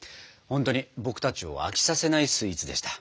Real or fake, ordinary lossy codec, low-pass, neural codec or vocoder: real; none; none; none